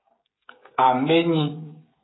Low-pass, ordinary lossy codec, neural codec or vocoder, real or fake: 7.2 kHz; AAC, 16 kbps; codec, 16 kHz, 16 kbps, FreqCodec, smaller model; fake